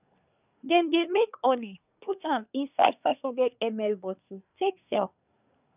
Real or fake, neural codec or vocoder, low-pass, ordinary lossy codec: fake; codec, 24 kHz, 1 kbps, SNAC; 3.6 kHz; none